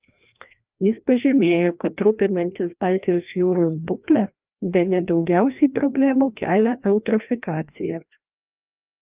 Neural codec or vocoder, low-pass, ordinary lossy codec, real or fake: codec, 16 kHz, 1 kbps, FreqCodec, larger model; 3.6 kHz; Opus, 24 kbps; fake